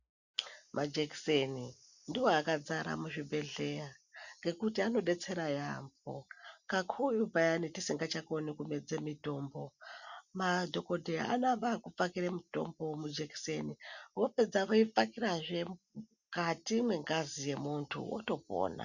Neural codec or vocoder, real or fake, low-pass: none; real; 7.2 kHz